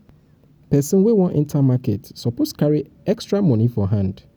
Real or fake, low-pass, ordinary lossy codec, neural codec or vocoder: real; none; none; none